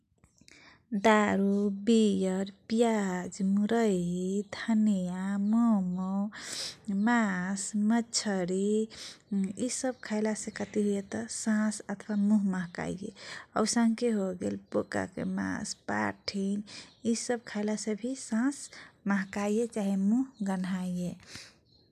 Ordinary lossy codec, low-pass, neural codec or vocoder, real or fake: none; 14.4 kHz; none; real